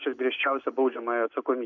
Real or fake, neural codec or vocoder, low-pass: real; none; 7.2 kHz